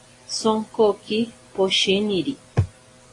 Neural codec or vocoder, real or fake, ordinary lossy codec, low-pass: none; real; AAC, 32 kbps; 10.8 kHz